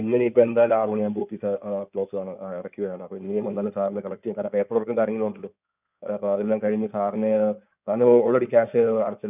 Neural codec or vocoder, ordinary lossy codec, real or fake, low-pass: codec, 16 kHz in and 24 kHz out, 2.2 kbps, FireRedTTS-2 codec; none; fake; 3.6 kHz